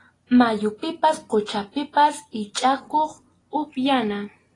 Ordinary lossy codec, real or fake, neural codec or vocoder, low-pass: AAC, 32 kbps; real; none; 10.8 kHz